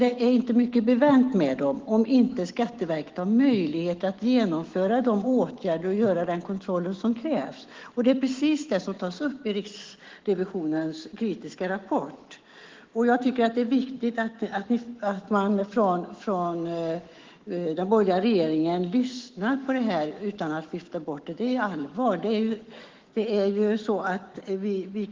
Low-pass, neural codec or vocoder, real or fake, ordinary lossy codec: 7.2 kHz; autoencoder, 48 kHz, 128 numbers a frame, DAC-VAE, trained on Japanese speech; fake; Opus, 16 kbps